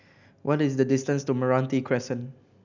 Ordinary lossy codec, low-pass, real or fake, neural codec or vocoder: none; 7.2 kHz; real; none